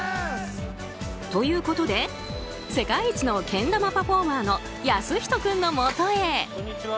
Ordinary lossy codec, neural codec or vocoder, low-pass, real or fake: none; none; none; real